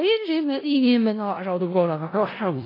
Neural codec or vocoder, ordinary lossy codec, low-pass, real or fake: codec, 16 kHz in and 24 kHz out, 0.4 kbps, LongCat-Audio-Codec, four codebook decoder; AAC, 24 kbps; 5.4 kHz; fake